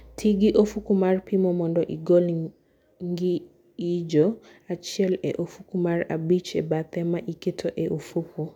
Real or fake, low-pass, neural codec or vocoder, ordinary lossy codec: real; 19.8 kHz; none; none